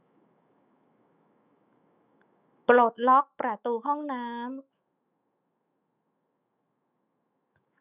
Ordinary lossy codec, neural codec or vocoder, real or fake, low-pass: none; autoencoder, 48 kHz, 128 numbers a frame, DAC-VAE, trained on Japanese speech; fake; 3.6 kHz